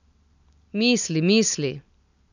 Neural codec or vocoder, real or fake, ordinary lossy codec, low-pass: none; real; none; 7.2 kHz